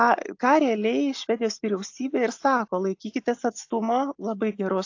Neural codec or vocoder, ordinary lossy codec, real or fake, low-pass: none; AAC, 48 kbps; real; 7.2 kHz